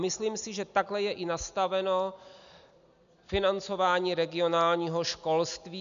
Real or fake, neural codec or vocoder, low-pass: real; none; 7.2 kHz